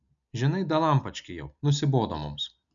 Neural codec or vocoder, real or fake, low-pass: none; real; 7.2 kHz